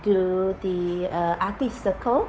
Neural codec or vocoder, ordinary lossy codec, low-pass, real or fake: codec, 16 kHz, 8 kbps, FunCodec, trained on Chinese and English, 25 frames a second; none; none; fake